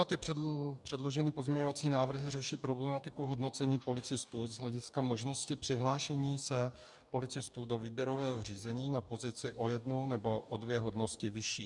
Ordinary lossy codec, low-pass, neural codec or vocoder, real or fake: MP3, 96 kbps; 10.8 kHz; codec, 44.1 kHz, 2.6 kbps, DAC; fake